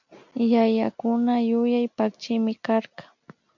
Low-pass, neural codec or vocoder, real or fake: 7.2 kHz; none; real